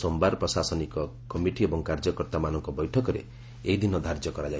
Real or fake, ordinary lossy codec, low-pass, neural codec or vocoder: real; none; none; none